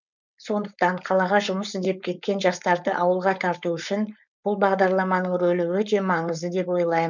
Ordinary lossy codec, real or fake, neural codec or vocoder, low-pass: none; fake; codec, 16 kHz, 4.8 kbps, FACodec; 7.2 kHz